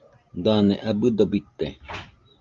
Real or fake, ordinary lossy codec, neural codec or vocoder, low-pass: real; Opus, 32 kbps; none; 7.2 kHz